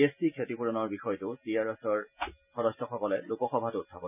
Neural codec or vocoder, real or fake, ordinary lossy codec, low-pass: none; real; none; 3.6 kHz